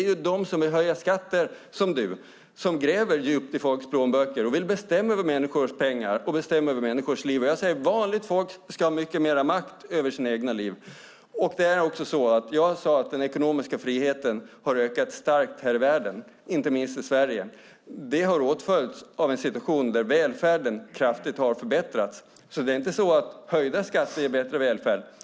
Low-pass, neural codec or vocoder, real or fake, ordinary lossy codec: none; none; real; none